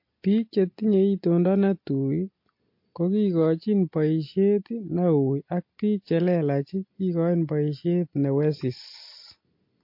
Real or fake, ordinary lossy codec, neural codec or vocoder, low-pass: real; MP3, 32 kbps; none; 5.4 kHz